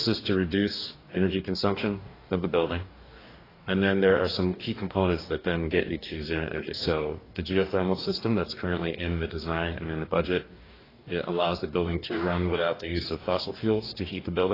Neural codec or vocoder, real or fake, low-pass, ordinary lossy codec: codec, 44.1 kHz, 2.6 kbps, DAC; fake; 5.4 kHz; AAC, 24 kbps